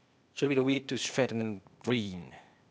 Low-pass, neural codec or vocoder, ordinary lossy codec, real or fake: none; codec, 16 kHz, 0.8 kbps, ZipCodec; none; fake